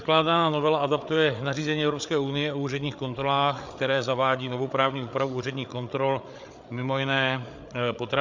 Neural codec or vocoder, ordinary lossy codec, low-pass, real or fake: codec, 16 kHz, 8 kbps, FreqCodec, larger model; MP3, 64 kbps; 7.2 kHz; fake